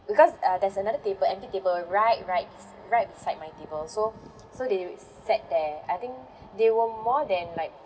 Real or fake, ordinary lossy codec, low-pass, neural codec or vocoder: real; none; none; none